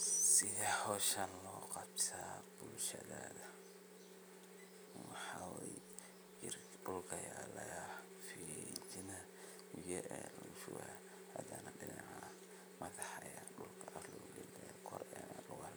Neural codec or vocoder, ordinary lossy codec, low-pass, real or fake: none; none; none; real